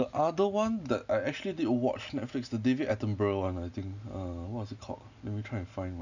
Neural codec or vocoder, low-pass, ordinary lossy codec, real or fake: none; 7.2 kHz; none; real